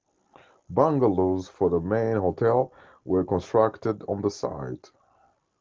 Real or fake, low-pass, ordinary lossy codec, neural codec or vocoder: real; 7.2 kHz; Opus, 16 kbps; none